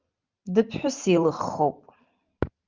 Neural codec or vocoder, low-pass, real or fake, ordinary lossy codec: vocoder, 44.1 kHz, 128 mel bands every 512 samples, BigVGAN v2; 7.2 kHz; fake; Opus, 24 kbps